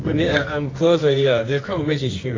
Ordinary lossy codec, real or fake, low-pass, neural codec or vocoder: AAC, 32 kbps; fake; 7.2 kHz; codec, 24 kHz, 0.9 kbps, WavTokenizer, medium music audio release